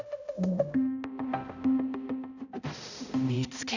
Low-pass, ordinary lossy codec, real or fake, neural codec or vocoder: 7.2 kHz; none; fake; codec, 16 kHz, 0.5 kbps, X-Codec, HuBERT features, trained on balanced general audio